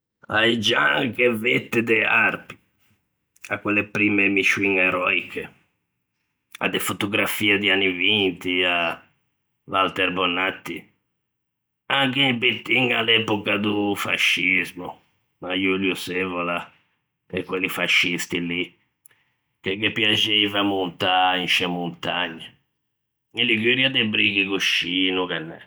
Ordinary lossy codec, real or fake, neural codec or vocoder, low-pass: none; real; none; none